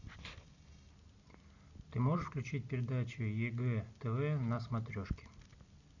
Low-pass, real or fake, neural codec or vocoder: 7.2 kHz; real; none